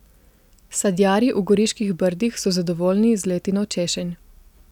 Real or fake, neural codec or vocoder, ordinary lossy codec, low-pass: real; none; none; 19.8 kHz